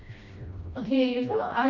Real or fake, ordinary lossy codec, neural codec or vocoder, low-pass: fake; none; codec, 16 kHz, 1 kbps, FreqCodec, smaller model; 7.2 kHz